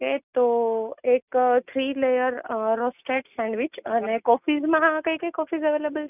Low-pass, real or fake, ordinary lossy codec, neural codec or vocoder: 3.6 kHz; real; none; none